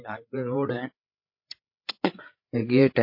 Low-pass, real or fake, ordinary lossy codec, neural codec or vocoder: 5.4 kHz; fake; MP3, 32 kbps; codec, 16 kHz, 8 kbps, FreqCodec, larger model